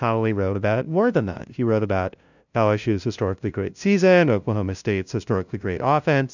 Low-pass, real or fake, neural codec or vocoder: 7.2 kHz; fake; codec, 16 kHz, 0.5 kbps, FunCodec, trained on LibriTTS, 25 frames a second